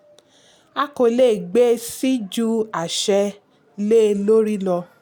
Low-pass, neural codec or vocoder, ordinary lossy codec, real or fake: none; none; none; real